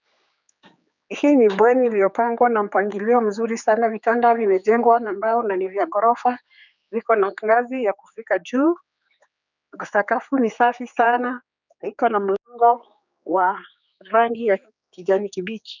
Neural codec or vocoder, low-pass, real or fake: codec, 16 kHz, 4 kbps, X-Codec, HuBERT features, trained on general audio; 7.2 kHz; fake